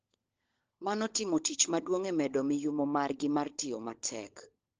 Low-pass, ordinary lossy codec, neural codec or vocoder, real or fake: 7.2 kHz; Opus, 16 kbps; codec, 16 kHz, 16 kbps, FunCodec, trained on LibriTTS, 50 frames a second; fake